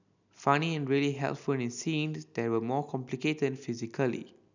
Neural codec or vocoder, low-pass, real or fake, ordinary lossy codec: none; 7.2 kHz; real; none